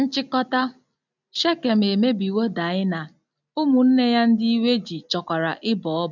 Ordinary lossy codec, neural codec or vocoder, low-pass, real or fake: none; none; 7.2 kHz; real